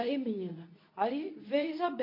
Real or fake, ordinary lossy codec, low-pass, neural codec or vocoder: fake; MP3, 32 kbps; 5.4 kHz; codec, 24 kHz, 0.9 kbps, WavTokenizer, medium speech release version 2